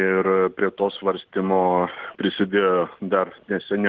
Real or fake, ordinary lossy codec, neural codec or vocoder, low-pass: real; Opus, 16 kbps; none; 7.2 kHz